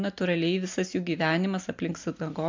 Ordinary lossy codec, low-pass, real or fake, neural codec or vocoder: MP3, 64 kbps; 7.2 kHz; real; none